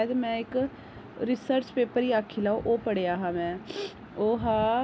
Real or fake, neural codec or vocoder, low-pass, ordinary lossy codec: real; none; none; none